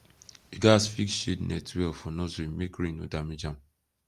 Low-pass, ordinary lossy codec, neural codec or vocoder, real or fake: 14.4 kHz; Opus, 32 kbps; none; real